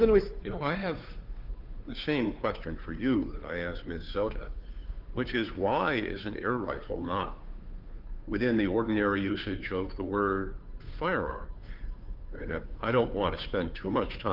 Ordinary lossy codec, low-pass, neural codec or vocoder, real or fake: Opus, 24 kbps; 5.4 kHz; codec, 16 kHz, 2 kbps, FunCodec, trained on Chinese and English, 25 frames a second; fake